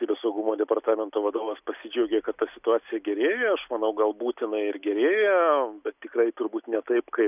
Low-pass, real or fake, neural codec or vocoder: 3.6 kHz; real; none